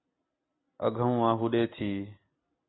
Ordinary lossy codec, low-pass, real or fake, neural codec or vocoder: AAC, 16 kbps; 7.2 kHz; real; none